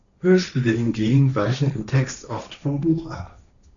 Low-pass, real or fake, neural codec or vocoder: 7.2 kHz; fake; codec, 16 kHz, 1.1 kbps, Voila-Tokenizer